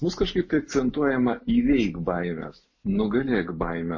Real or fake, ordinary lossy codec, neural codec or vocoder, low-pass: real; MP3, 32 kbps; none; 7.2 kHz